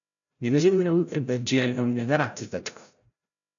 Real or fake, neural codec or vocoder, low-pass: fake; codec, 16 kHz, 0.5 kbps, FreqCodec, larger model; 7.2 kHz